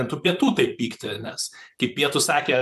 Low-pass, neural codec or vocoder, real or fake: 14.4 kHz; vocoder, 44.1 kHz, 128 mel bands, Pupu-Vocoder; fake